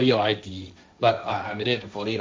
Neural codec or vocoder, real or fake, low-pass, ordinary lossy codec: codec, 16 kHz, 1.1 kbps, Voila-Tokenizer; fake; none; none